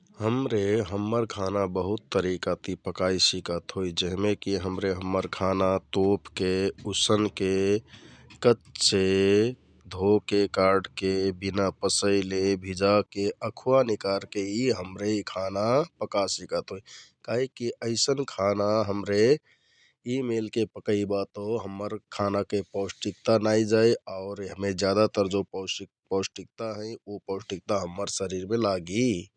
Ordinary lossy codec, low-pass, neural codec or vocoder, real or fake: none; 9.9 kHz; none; real